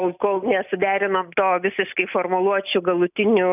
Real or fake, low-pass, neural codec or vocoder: real; 3.6 kHz; none